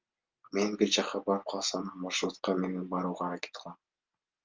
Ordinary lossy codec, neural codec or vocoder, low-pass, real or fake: Opus, 32 kbps; none; 7.2 kHz; real